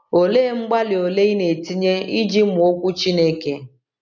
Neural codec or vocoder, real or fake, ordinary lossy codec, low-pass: none; real; none; 7.2 kHz